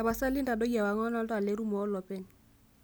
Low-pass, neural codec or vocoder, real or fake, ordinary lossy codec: none; none; real; none